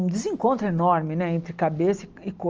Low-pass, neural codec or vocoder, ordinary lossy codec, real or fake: 7.2 kHz; none; Opus, 24 kbps; real